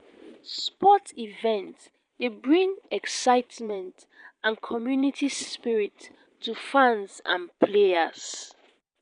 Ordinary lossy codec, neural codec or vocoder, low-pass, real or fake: none; vocoder, 22.05 kHz, 80 mel bands, Vocos; 9.9 kHz; fake